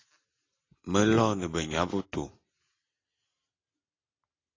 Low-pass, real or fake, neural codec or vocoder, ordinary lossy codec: 7.2 kHz; real; none; AAC, 32 kbps